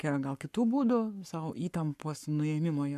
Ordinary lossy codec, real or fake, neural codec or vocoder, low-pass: MP3, 96 kbps; fake; codec, 44.1 kHz, 7.8 kbps, Pupu-Codec; 14.4 kHz